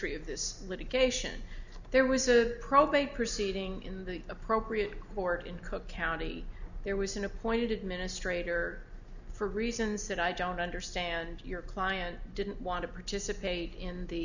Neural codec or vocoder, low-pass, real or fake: none; 7.2 kHz; real